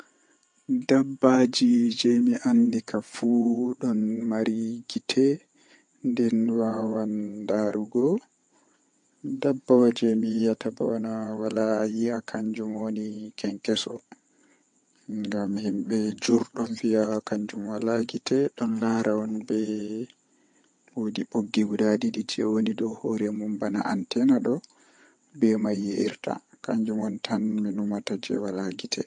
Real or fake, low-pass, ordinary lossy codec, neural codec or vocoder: fake; 9.9 kHz; MP3, 48 kbps; vocoder, 22.05 kHz, 80 mel bands, WaveNeXt